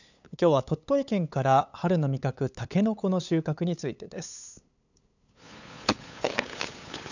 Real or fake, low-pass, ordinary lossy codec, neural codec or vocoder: fake; 7.2 kHz; none; codec, 16 kHz, 8 kbps, FunCodec, trained on LibriTTS, 25 frames a second